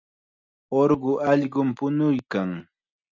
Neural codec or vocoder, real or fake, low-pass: none; real; 7.2 kHz